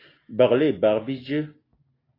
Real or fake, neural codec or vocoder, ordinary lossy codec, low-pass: real; none; AAC, 24 kbps; 5.4 kHz